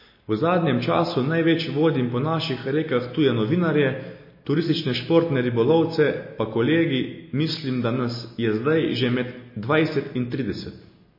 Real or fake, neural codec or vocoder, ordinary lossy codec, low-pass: real; none; MP3, 24 kbps; 5.4 kHz